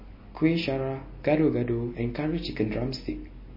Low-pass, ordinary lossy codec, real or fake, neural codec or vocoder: 5.4 kHz; MP3, 24 kbps; real; none